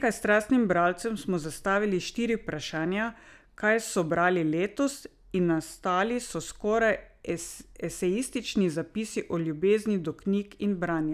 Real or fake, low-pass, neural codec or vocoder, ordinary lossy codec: real; 14.4 kHz; none; none